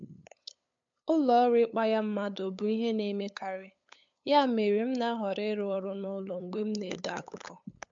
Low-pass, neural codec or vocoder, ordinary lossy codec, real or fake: 7.2 kHz; codec, 16 kHz, 8 kbps, FunCodec, trained on LibriTTS, 25 frames a second; MP3, 64 kbps; fake